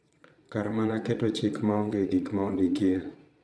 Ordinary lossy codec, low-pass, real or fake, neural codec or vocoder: none; none; fake; vocoder, 22.05 kHz, 80 mel bands, Vocos